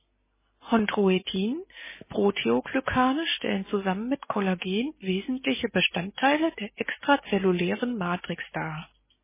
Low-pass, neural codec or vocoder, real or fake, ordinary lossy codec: 3.6 kHz; none; real; MP3, 16 kbps